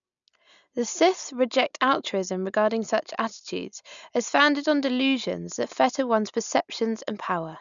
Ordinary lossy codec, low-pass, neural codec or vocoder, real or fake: none; 7.2 kHz; none; real